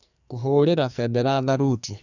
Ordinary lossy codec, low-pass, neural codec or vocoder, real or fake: none; 7.2 kHz; codec, 44.1 kHz, 2.6 kbps, SNAC; fake